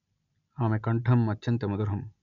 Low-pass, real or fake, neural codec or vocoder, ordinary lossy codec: 7.2 kHz; real; none; MP3, 96 kbps